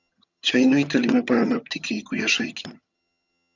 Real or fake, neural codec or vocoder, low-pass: fake; vocoder, 22.05 kHz, 80 mel bands, HiFi-GAN; 7.2 kHz